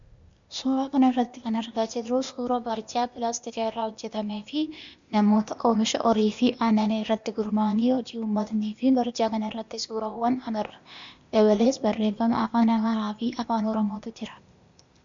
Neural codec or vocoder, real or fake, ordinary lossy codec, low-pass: codec, 16 kHz, 0.8 kbps, ZipCodec; fake; MP3, 64 kbps; 7.2 kHz